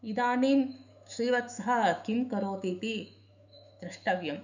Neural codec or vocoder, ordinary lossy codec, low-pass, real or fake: autoencoder, 48 kHz, 128 numbers a frame, DAC-VAE, trained on Japanese speech; none; 7.2 kHz; fake